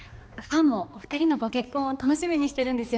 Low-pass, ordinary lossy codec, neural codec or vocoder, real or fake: none; none; codec, 16 kHz, 2 kbps, X-Codec, HuBERT features, trained on balanced general audio; fake